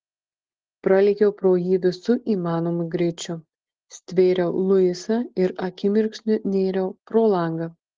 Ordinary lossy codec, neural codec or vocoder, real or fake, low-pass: Opus, 16 kbps; none; real; 7.2 kHz